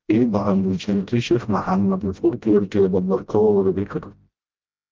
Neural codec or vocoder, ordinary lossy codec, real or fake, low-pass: codec, 16 kHz, 0.5 kbps, FreqCodec, smaller model; Opus, 24 kbps; fake; 7.2 kHz